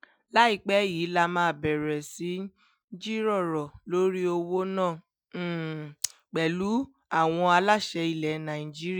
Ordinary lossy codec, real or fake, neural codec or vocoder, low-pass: none; real; none; none